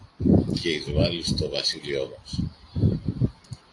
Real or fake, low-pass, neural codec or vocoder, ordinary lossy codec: fake; 10.8 kHz; vocoder, 44.1 kHz, 128 mel bands every 256 samples, BigVGAN v2; AAC, 48 kbps